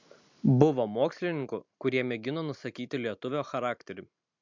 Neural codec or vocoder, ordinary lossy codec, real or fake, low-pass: vocoder, 44.1 kHz, 128 mel bands every 256 samples, BigVGAN v2; MP3, 64 kbps; fake; 7.2 kHz